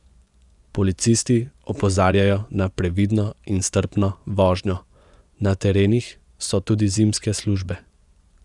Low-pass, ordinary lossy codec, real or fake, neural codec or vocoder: 10.8 kHz; none; fake; vocoder, 24 kHz, 100 mel bands, Vocos